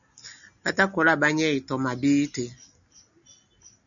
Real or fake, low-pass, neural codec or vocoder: real; 7.2 kHz; none